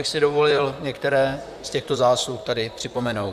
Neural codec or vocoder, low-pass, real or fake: vocoder, 44.1 kHz, 128 mel bands, Pupu-Vocoder; 14.4 kHz; fake